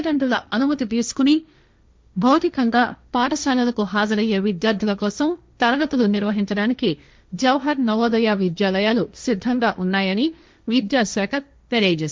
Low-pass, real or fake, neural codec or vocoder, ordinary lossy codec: none; fake; codec, 16 kHz, 1.1 kbps, Voila-Tokenizer; none